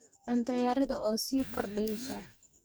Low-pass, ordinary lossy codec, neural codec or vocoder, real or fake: none; none; codec, 44.1 kHz, 2.6 kbps, DAC; fake